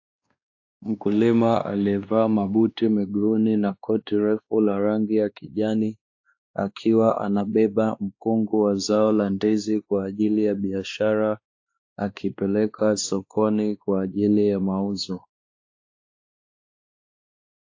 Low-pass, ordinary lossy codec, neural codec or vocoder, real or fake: 7.2 kHz; AAC, 48 kbps; codec, 16 kHz, 2 kbps, X-Codec, WavLM features, trained on Multilingual LibriSpeech; fake